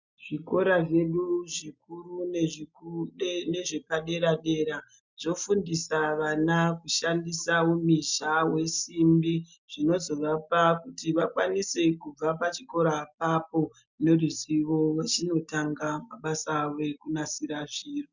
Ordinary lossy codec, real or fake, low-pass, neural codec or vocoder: MP3, 64 kbps; real; 7.2 kHz; none